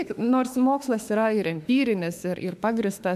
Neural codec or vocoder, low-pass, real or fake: autoencoder, 48 kHz, 32 numbers a frame, DAC-VAE, trained on Japanese speech; 14.4 kHz; fake